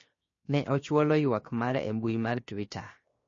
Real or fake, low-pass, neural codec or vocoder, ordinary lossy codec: fake; 7.2 kHz; codec, 16 kHz, 0.7 kbps, FocalCodec; MP3, 32 kbps